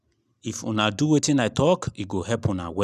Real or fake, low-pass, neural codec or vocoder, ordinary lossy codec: real; 14.4 kHz; none; none